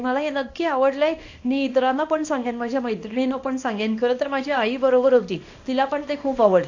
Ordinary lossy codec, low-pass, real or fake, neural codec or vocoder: AAC, 48 kbps; 7.2 kHz; fake; codec, 24 kHz, 0.9 kbps, WavTokenizer, small release